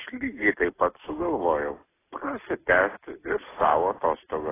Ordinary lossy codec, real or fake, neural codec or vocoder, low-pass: AAC, 16 kbps; real; none; 3.6 kHz